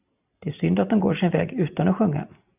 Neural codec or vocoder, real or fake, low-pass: none; real; 3.6 kHz